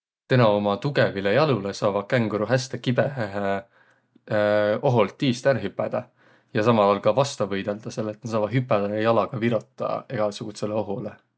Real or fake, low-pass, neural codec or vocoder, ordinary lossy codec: real; none; none; none